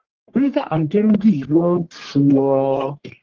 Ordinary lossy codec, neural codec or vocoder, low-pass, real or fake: Opus, 16 kbps; codec, 44.1 kHz, 1.7 kbps, Pupu-Codec; 7.2 kHz; fake